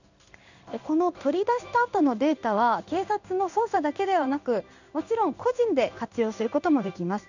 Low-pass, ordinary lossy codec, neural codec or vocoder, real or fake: 7.2 kHz; none; codec, 16 kHz in and 24 kHz out, 1 kbps, XY-Tokenizer; fake